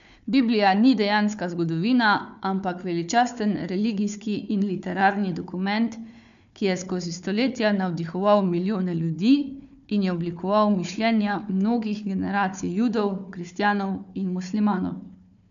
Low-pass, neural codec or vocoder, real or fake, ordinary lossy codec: 7.2 kHz; codec, 16 kHz, 4 kbps, FunCodec, trained on Chinese and English, 50 frames a second; fake; none